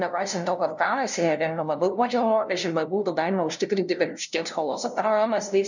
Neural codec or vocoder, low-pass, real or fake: codec, 16 kHz, 0.5 kbps, FunCodec, trained on LibriTTS, 25 frames a second; 7.2 kHz; fake